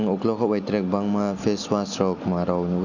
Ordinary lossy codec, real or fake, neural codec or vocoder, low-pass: none; real; none; 7.2 kHz